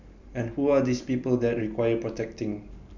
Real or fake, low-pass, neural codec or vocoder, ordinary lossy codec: real; 7.2 kHz; none; none